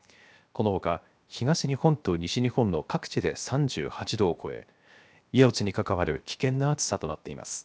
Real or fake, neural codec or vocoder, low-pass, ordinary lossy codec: fake; codec, 16 kHz, 0.7 kbps, FocalCodec; none; none